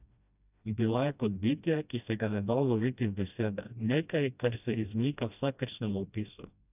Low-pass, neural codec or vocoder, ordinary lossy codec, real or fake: 3.6 kHz; codec, 16 kHz, 1 kbps, FreqCodec, smaller model; none; fake